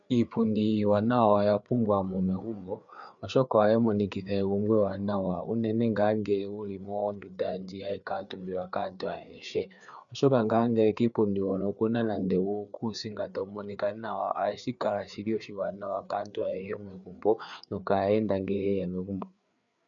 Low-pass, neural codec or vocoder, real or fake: 7.2 kHz; codec, 16 kHz, 4 kbps, FreqCodec, larger model; fake